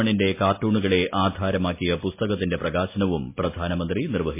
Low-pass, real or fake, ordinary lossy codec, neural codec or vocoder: 3.6 kHz; real; MP3, 24 kbps; none